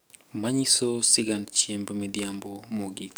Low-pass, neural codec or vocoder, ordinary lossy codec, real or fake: none; codec, 44.1 kHz, 7.8 kbps, DAC; none; fake